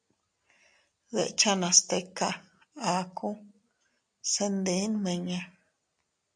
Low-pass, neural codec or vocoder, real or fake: 9.9 kHz; none; real